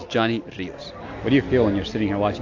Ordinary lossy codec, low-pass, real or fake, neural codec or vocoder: AAC, 48 kbps; 7.2 kHz; real; none